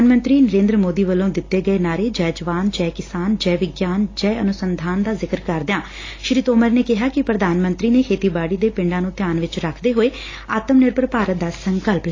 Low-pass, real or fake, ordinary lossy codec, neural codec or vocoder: 7.2 kHz; real; AAC, 32 kbps; none